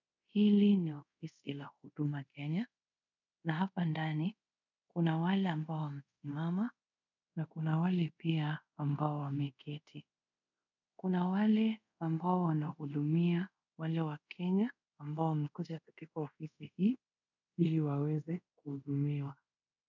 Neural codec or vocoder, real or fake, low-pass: codec, 24 kHz, 0.5 kbps, DualCodec; fake; 7.2 kHz